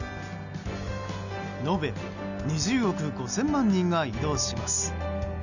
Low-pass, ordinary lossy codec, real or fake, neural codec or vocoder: 7.2 kHz; none; real; none